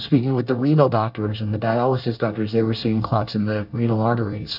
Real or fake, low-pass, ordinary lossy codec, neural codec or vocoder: fake; 5.4 kHz; AAC, 48 kbps; codec, 24 kHz, 1 kbps, SNAC